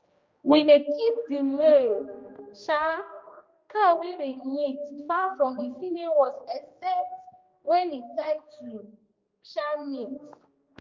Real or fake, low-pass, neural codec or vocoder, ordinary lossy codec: fake; 7.2 kHz; codec, 16 kHz, 1 kbps, X-Codec, HuBERT features, trained on general audio; Opus, 24 kbps